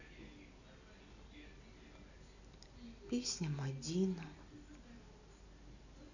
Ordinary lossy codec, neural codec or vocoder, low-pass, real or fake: none; none; 7.2 kHz; real